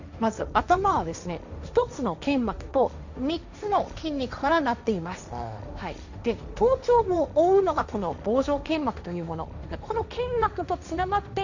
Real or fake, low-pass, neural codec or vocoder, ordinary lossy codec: fake; 7.2 kHz; codec, 16 kHz, 1.1 kbps, Voila-Tokenizer; none